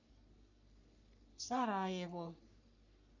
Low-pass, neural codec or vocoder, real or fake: 7.2 kHz; codec, 44.1 kHz, 3.4 kbps, Pupu-Codec; fake